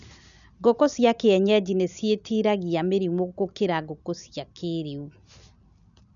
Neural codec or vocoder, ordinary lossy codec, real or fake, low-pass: none; none; real; 7.2 kHz